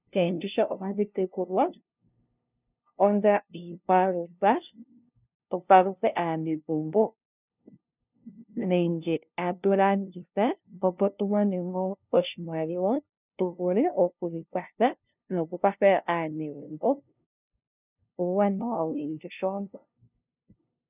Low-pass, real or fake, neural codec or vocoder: 3.6 kHz; fake; codec, 16 kHz, 0.5 kbps, FunCodec, trained on LibriTTS, 25 frames a second